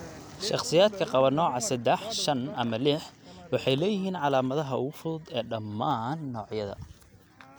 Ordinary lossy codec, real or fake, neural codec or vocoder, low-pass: none; real; none; none